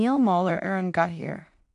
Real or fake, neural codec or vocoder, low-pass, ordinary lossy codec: fake; codec, 16 kHz in and 24 kHz out, 0.4 kbps, LongCat-Audio-Codec, two codebook decoder; 10.8 kHz; AAC, 64 kbps